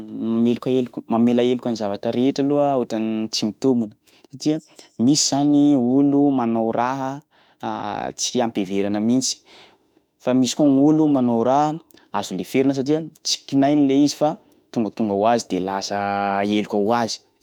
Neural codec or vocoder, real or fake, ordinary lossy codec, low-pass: autoencoder, 48 kHz, 32 numbers a frame, DAC-VAE, trained on Japanese speech; fake; none; 19.8 kHz